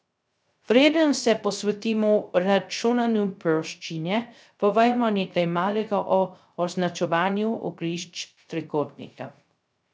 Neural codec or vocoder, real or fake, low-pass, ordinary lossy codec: codec, 16 kHz, 0.3 kbps, FocalCodec; fake; none; none